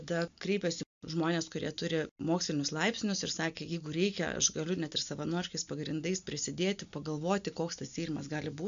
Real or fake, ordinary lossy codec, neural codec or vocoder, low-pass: real; MP3, 64 kbps; none; 7.2 kHz